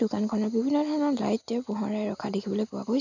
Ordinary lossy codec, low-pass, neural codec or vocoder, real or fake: none; 7.2 kHz; none; real